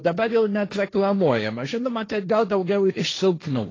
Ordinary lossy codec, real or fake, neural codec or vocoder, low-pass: AAC, 32 kbps; fake; codec, 16 kHz, 1.1 kbps, Voila-Tokenizer; 7.2 kHz